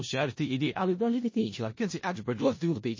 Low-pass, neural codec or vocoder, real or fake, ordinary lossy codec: 7.2 kHz; codec, 16 kHz in and 24 kHz out, 0.4 kbps, LongCat-Audio-Codec, four codebook decoder; fake; MP3, 32 kbps